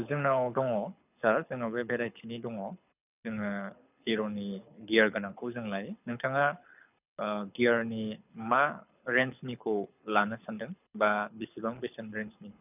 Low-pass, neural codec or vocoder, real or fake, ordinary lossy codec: 3.6 kHz; codec, 24 kHz, 6 kbps, HILCodec; fake; none